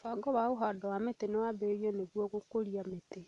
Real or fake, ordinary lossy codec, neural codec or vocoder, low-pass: real; none; none; 10.8 kHz